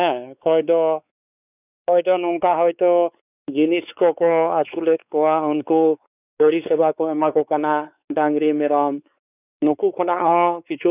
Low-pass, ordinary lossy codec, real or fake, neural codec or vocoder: 3.6 kHz; none; fake; codec, 16 kHz, 4 kbps, X-Codec, WavLM features, trained on Multilingual LibriSpeech